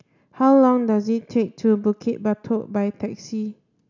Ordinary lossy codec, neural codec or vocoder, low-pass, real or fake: none; none; 7.2 kHz; real